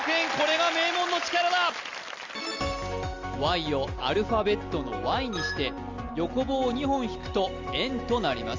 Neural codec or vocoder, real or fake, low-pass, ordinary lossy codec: none; real; 7.2 kHz; Opus, 32 kbps